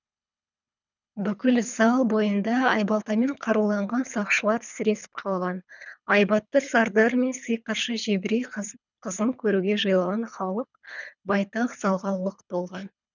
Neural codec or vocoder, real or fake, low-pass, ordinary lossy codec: codec, 24 kHz, 3 kbps, HILCodec; fake; 7.2 kHz; none